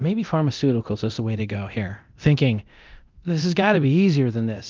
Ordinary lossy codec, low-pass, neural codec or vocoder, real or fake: Opus, 32 kbps; 7.2 kHz; codec, 24 kHz, 0.9 kbps, DualCodec; fake